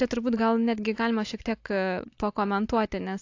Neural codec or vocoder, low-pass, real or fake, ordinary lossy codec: none; 7.2 kHz; real; AAC, 48 kbps